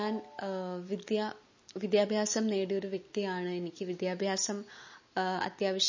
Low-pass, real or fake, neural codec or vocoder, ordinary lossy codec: 7.2 kHz; real; none; MP3, 32 kbps